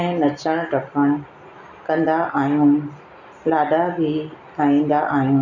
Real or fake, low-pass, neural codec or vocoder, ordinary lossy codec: real; 7.2 kHz; none; none